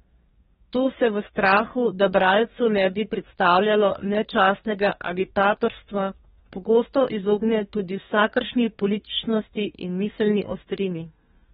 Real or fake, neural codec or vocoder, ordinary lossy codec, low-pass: fake; codec, 32 kHz, 1.9 kbps, SNAC; AAC, 16 kbps; 14.4 kHz